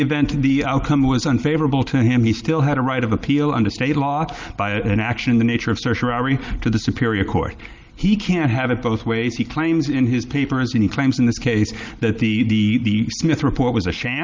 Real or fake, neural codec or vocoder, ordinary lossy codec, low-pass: real; none; Opus, 32 kbps; 7.2 kHz